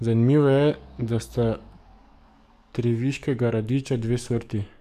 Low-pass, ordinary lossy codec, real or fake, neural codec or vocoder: 14.4 kHz; none; fake; codec, 44.1 kHz, 7.8 kbps, DAC